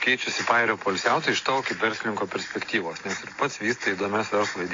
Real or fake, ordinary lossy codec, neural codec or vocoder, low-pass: real; AAC, 32 kbps; none; 7.2 kHz